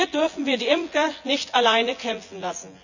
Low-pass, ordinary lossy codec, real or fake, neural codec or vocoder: 7.2 kHz; none; fake; vocoder, 24 kHz, 100 mel bands, Vocos